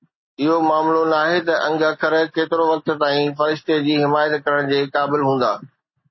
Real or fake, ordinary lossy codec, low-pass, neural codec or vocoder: real; MP3, 24 kbps; 7.2 kHz; none